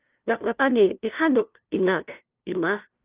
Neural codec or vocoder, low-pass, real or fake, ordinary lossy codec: codec, 16 kHz, 0.5 kbps, FunCodec, trained on LibriTTS, 25 frames a second; 3.6 kHz; fake; Opus, 32 kbps